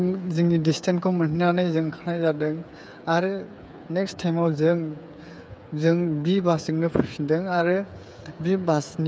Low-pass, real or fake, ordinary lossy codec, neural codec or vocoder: none; fake; none; codec, 16 kHz, 8 kbps, FreqCodec, smaller model